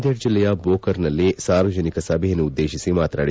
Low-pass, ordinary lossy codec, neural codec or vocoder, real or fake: none; none; none; real